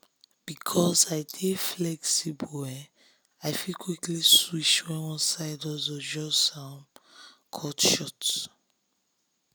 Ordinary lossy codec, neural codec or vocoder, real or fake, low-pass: none; none; real; none